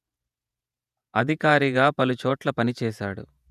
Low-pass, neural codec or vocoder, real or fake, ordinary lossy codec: 14.4 kHz; vocoder, 48 kHz, 128 mel bands, Vocos; fake; none